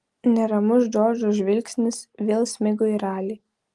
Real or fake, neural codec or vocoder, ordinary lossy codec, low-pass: real; none; Opus, 24 kbps; 10.8 kHz